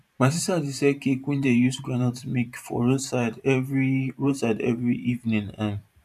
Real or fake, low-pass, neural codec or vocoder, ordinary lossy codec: fake; 14.4 kHz; vocoder, 44.1 kHz, 128 mel bands every 512 samples, BigVGAN v2; none